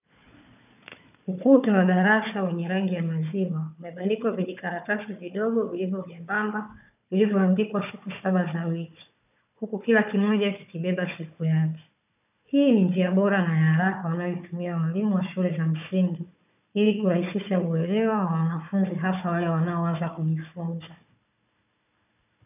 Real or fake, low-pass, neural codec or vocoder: fake; 3.6 kHz; codec, 16 kHz, 4 kbps, FunCodec, trained on Chinese and English, 50 frames a second